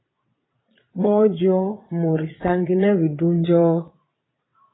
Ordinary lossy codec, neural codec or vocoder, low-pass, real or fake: AAC, 16 kbps; vocoder, 22.05 kHz, 80 mel bands, Vocos; 7.2 kHz; fake